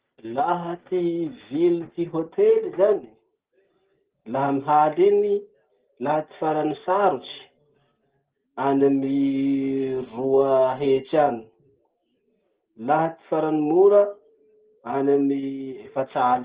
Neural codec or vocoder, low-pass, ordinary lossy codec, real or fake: none; 3.6 kHz; Opus, 16 kbps; real